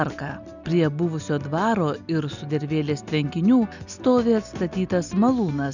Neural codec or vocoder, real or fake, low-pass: none; real; 7.2 kHz